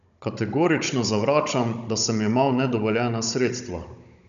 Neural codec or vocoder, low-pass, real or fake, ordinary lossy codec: codec, 16 kHz, 16 kbps, FunCodec, trained on Chinese and English, 50 frames a second; 7.2 kHz; fake; none